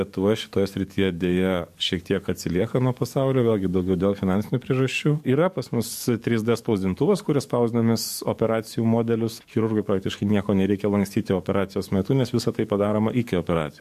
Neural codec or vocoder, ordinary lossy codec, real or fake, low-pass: codec, 44.1 kHz, 7.8 kbps, DAC; MP3, 64 kbps; fake; 14.4 kHz